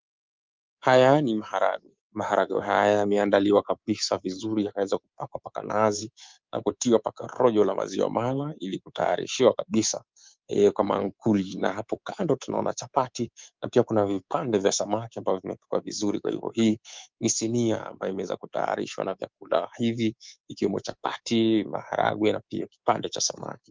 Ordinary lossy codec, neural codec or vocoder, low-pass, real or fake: Opus, 32 kbps; codec, 24 kHz, 3.1 kbps, DualCodec; 7.2 kHz; fake